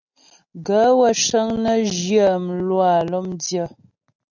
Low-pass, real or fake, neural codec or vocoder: 7.2 kHz; real; none